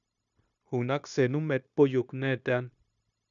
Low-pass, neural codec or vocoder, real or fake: 7.2 kHz; codec, 16 kHz, 0.9 kbps, LongCat-Audio-Codec; fake